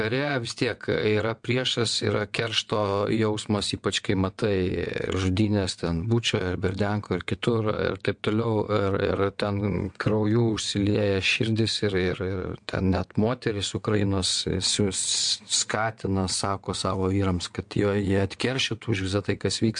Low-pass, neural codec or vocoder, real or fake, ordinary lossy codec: 9.9 kHz; vocoder, 22.05 kHz, 80 mel bands, WaveNeXt; fake; MP3, 64 kbps